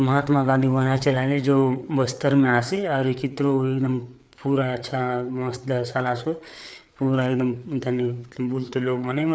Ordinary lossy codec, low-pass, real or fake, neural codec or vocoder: none; none; fake; codec, 16 kHz, 4 kbps, FreqCodec, larger model